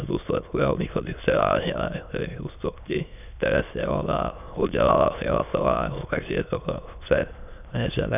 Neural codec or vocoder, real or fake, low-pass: autoencoder, 22.05 kHz, a latent of 192 numbers a frame, VITS, trained on many speakers; fake; 3.6 kHz